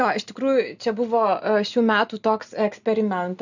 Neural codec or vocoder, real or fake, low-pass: none; real; 7.2 kHz